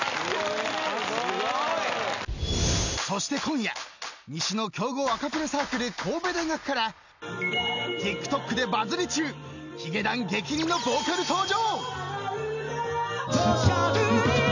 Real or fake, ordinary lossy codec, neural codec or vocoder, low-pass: real; none; none; 7.2 kHz